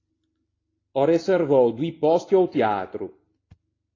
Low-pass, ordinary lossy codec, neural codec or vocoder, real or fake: 7.2 kHz; AAC, 32 kbps; none; real